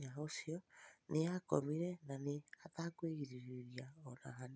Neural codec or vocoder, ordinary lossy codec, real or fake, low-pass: none; none; real; none